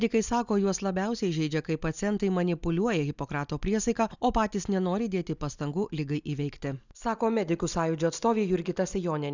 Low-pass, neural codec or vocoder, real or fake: 7.2 kHz; none; real